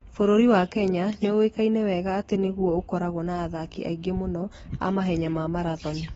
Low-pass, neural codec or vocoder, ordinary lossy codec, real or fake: 19.8 kHz; autoencoder, 48 kHz, 128 numbers a frame, DAC-VAE, trained on Japanese speech; AAC, 24 kbps; fake